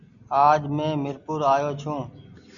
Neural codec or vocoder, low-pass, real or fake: none; 7.2 kHz; real